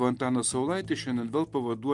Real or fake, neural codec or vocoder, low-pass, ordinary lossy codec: real; none; 10.8 kHz; Opus, 32 kbps